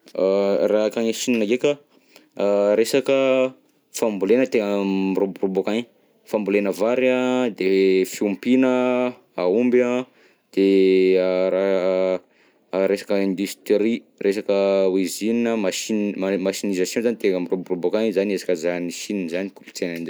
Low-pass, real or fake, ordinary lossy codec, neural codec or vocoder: none; real; none; none